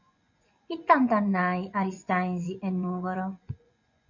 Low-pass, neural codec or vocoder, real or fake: 7.2 kHz; vocoder, 24 kHz, 100 mel bands, Vocos; fake